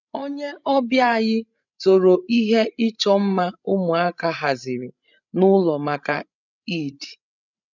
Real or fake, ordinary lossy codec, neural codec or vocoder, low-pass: fake; none; codec, 16 kHz, 16 kbps, FreqCodec, larger model; 7.2 kHz